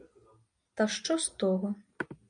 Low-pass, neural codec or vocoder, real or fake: 9.9 kHz; none; real